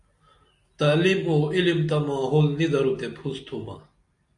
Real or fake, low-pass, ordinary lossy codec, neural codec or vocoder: real; 10.8 kHz; AAC, 64 kbps; none